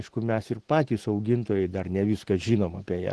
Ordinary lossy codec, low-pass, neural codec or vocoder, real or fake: Opus, 16 kbps; 10.8 kHz; none; real